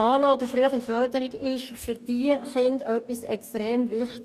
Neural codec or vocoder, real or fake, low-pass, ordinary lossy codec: codec, 44.1 kHz, 2.6 kbps, DAC; fake; 14.4 kHz; none